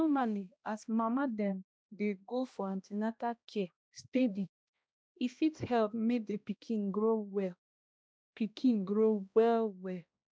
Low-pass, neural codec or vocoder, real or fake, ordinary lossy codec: none; codec, 16 kHz, 1 kbps, X-Codec, HuBERT features, trained on balanced general audio; fake; none